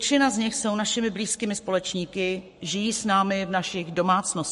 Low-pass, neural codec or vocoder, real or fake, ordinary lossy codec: 14.4 kHz; codec, 44.1 kHz, 7.8 kbps, Pupu-Codec; fake; MP3, 48 kbps